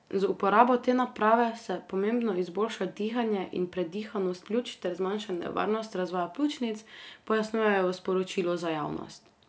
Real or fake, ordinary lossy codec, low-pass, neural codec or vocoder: real; none; none; none